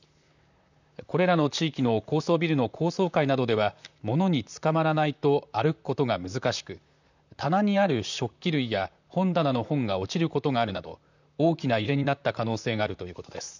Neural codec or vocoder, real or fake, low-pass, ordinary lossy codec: vocoder, 44.1 kHz, 128 mel bands, Pupu-Vocoder; fake; 7.2 kHz; none